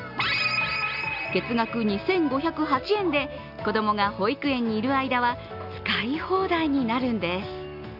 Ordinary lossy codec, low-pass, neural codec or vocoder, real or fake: none; 5.4 kHz; none; real